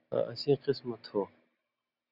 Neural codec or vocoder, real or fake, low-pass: none; real; 5.4 kHz